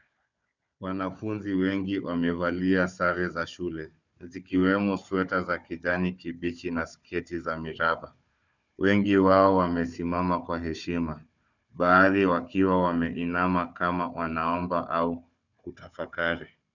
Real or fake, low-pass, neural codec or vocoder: fake; 7.2 kHz; codec, 16 kHz, 4 kbps, FunCodec, trained on Chinese and English, 50 frames a second